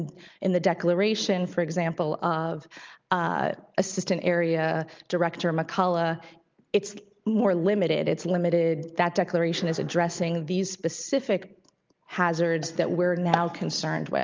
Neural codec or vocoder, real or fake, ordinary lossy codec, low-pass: none; real; Opus, 24 kbps; 7.2 kHz